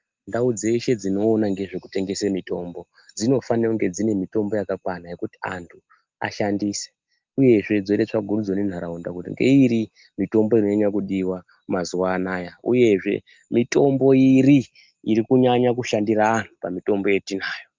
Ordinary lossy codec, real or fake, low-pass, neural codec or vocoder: Opus, 32 kbps; real; 7.2 kHz; none